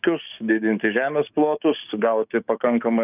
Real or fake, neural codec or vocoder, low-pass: fake; codec, 16 kHz, 6 kbps, DAC; 3.6 kHz